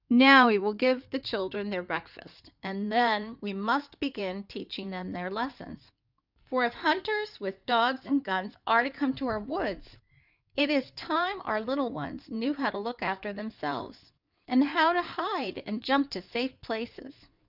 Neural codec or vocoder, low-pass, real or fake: codec, 16 kHz in and 24 kHz out, 2.2 kbps, FireRedTTS-2 codec; 5.4 kHz; fake